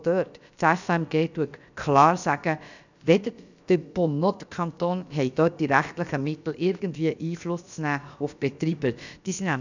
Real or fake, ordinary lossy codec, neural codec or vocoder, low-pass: fake; none; codec, 16 kHz, about 1 kbps, DyCAST, with the encoder's durations; 7.2 kHz